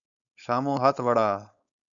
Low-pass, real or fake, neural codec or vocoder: 7.2 kHz; fake; codec, 16 kHz, 4.8 kbps, FACodec